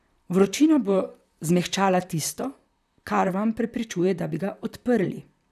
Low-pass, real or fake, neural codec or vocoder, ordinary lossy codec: 14.4 kHz; fake; vocoder, 44.1 kHz, 128 mel bands, Pupu-Vocoder; none